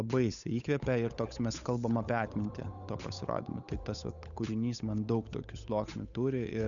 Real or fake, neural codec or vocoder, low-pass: fake; codec, 16 kHz, 8 kbps, FunCodec, trained on Chinese and English, 25 frames a second; 7.2 kHz